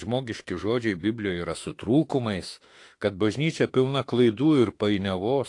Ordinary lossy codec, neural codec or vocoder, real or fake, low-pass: AAC, 48 kbps; autoencoder, 48 kHz, 32 numbers a frame, DAC-VAE, trained on Japanese speech; fake; 10.8 kHz